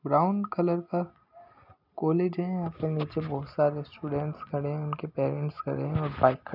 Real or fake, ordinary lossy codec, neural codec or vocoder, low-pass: real; none; none; 5.4 kHz